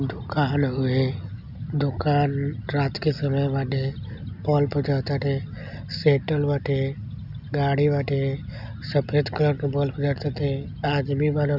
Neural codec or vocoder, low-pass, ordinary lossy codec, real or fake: none; 5.4 kHz; none; real